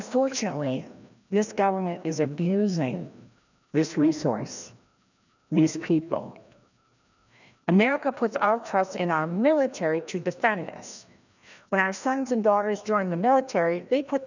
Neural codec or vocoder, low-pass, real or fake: codec, 16 kHz, 1 kbps, FreqCodec, larger model; 7.2 kHz; fake